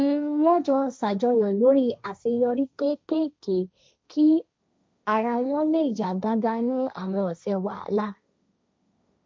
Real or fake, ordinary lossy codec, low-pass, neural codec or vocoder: fake; none; none; codec, 16 kHz, 1.1 kbps, Voila-Tokenizer